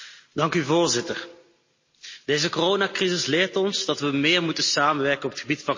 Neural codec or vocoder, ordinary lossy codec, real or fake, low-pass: none; MP3, 32 kbps; real; 7.2 kHz